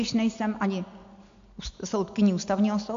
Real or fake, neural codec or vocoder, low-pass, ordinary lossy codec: real; none; 7.2 kHz; AAC, 64 kbps